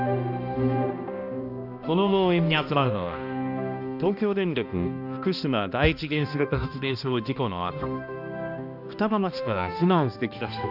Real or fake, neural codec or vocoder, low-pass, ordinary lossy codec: fake; codec, 16 kHz, 1 kbps, X-Codec, HuBERT features, trained on balanced general audio; 5.4 kHz; none